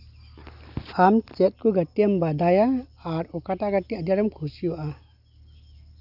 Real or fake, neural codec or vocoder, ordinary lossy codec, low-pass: real; none; none; 5.4 kHz